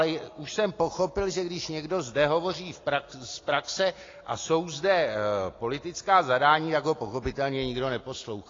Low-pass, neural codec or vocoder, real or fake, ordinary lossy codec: 7.2 kHz; none; real; AAC, 32 kbps